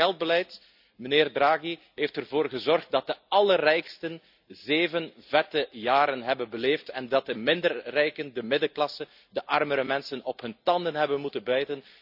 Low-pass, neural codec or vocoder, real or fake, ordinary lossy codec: 5.4 kHz; none; real; none